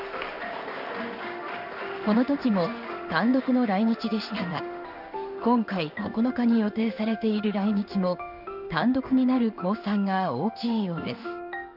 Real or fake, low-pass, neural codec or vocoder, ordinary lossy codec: fake; 5.4 kHz; codec, 16 kHz in and 24 kHz out, 1 kbps, XY-Tokenizer; none